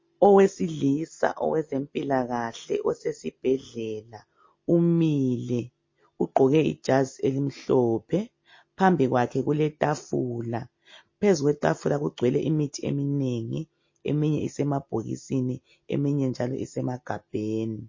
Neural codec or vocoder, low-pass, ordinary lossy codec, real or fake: none; 7.2 kHz; MP3, 32 kbps; real